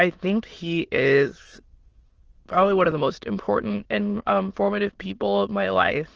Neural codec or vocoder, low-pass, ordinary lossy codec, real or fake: autoencoder, 22.05 kHz, a latent of 192 numbers a frame, VITS, trained on many speakers; 7.2 kHz; Opus, 16 kbps; fake